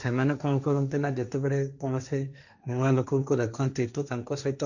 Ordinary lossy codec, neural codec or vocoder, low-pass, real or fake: none; codec, 16 kHz, 1.1 kbps, Voila-Tokenizer; 7.2 kHz; fake